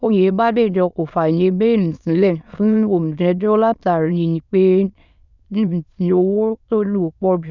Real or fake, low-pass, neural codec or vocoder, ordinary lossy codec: fake; 7.2 kHz; autoencoder, 22.05 kHz, a latent of 192 numbers a frame, VITS, trained on many speakers; none